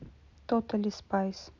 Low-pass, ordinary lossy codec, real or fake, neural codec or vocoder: 7.2 kHz; none; real; none